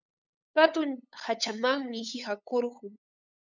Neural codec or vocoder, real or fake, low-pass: codec, 16 kHz, 8 kbps, FunCodec, trained on LibriTTS, 25 frames a second; fake; 7.2 kHz